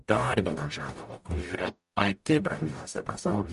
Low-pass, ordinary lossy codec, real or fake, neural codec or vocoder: 14.4 kHz; MP3, 48 kbps; fake; codec, 44.1 kHz, 0.9 kbps, DAC